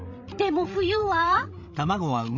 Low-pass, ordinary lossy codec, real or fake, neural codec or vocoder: 7.2 kHz; none; fake; codec, 16 kHz, 16 kbps, FreqCodec, larger model